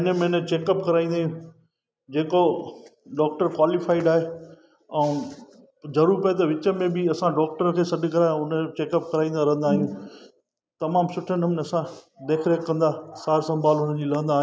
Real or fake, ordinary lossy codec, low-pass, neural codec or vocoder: real; none; none; none